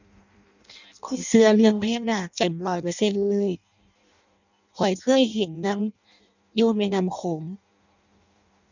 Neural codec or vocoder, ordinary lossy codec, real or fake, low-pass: codec, 16 kHz in and 24 kHz out, 0.6 kbps, FireRedTTS-2 codec; none; fake; 7.2 kHz